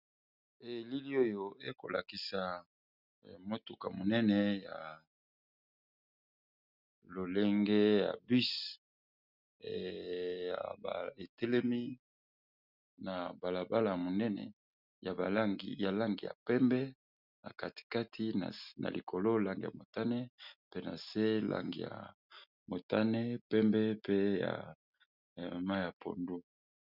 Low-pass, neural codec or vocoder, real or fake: 5.4 kHz; none; real